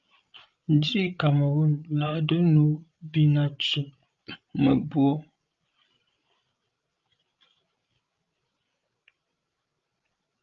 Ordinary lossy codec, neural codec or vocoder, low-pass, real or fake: Opus, 32 kbps; codec, 16 kHz, 16 kbps, FreqCodec, larger model; 7.2 kHz; fake